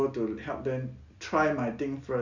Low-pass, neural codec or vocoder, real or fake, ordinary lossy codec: 7.2 kHz; none; real; Opus, 64 kbps